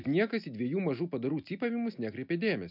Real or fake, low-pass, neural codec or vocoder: real; 5.4 kHz; none